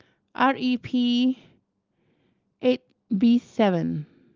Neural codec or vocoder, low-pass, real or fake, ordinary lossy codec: none; 7.2 kHz; real; Opus, 32 kbps